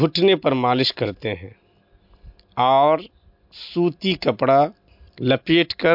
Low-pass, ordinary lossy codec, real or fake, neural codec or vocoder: 5.4 kHz; MP3, 48 kbps; real; none